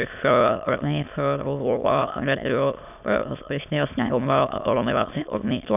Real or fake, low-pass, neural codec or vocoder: fake; 3.6 kHz; autoencoder, 22.05 kHz, a latent of 192 numbers a frame, VITS, trained on many speakers